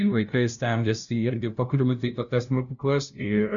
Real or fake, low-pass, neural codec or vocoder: fake; 7.2 kHz; codec, 16 kHz, 0.5 kbps, FunCodec, trained on LibriTTS, 25 frames a second